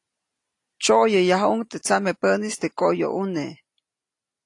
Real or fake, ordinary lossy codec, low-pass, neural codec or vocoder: real; AAC, 48 kbps; 10.8 kHz; none